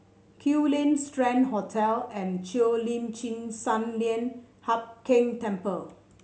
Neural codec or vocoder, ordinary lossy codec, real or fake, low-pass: none; none; real; none